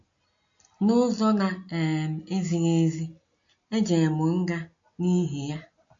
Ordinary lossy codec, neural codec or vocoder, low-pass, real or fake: MP3, 48 kbps; none; 7.2 kHz; real